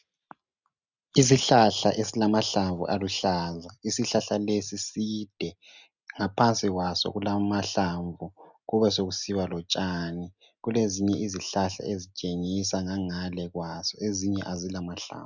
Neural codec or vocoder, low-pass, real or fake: none; 7.2 kHz; real